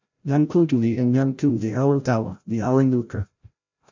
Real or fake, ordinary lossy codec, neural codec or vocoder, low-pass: fake; MP3, 64 kbps; codec, 16 kHz, 0.5 kbps, FreqCodec, larger model; 7.2 kHz